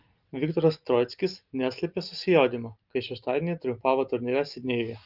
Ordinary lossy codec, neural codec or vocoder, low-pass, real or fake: Opus, 32 kbps; none; 5.4 kHz; real